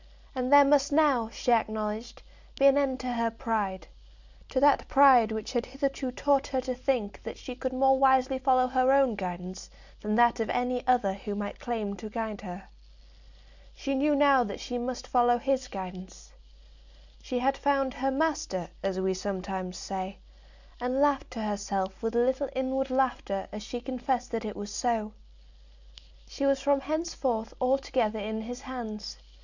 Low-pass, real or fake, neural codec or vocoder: 7.2 kHz; real; none